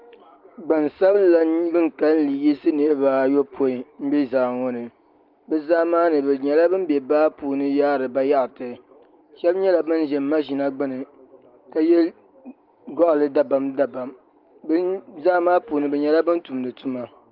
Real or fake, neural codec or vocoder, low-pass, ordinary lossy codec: real; none; 5.4 kHz; Opus, 32 kbps